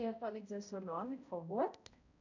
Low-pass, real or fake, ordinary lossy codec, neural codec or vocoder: 7.2 kHz; fake; none; codec, 16 kHz, 0.5 kbps, X-Codec, HuBERT features, trained on balanced general audio